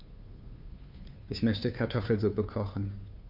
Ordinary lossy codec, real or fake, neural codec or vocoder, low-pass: none; fake; codec, 16 kHz, 2 kbps, FunCodec, trained on Chinese and English, 25 frames a second; 5.4 kHz